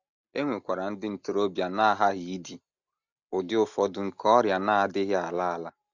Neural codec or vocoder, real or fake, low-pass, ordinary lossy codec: none; real; 7.2 kHz; none